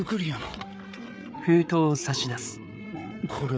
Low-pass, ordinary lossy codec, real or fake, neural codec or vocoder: none; none; fake; codec, 16 kHz, 8 kbps, FreqCodec, larger model